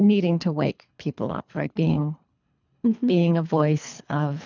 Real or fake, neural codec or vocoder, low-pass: fake; codec, 24 kHz, 3 kbps, HILCodec; 7.2 kHz